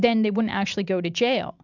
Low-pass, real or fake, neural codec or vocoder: 7.2 kHz; real; none